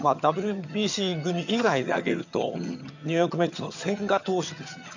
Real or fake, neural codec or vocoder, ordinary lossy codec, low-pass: fake; vocoder, 22.05 kHz, 80 mel bands, HiFi-GAN; none; 7.2 kHz